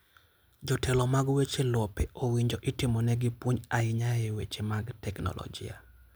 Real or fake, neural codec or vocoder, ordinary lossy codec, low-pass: real; none; none; none